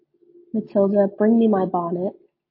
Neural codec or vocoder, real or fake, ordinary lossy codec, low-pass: none; real; MP3, 24 kbps; 5.4 kHz